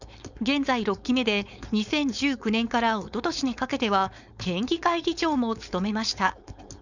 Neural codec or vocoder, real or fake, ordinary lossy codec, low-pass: codec, 16 kHz, 4.8 kbps, FACodec; fake; none; 7.2 kHz